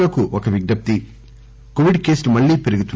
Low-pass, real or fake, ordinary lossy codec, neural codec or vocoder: none; real; none; none